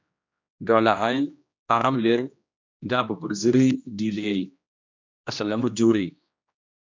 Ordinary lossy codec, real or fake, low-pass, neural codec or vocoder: MP3, 64 kbps; fake; 7.2 kHz; codec, 16 kHz, 1 kbps, X-Codec, HuBERT features, trained on general audio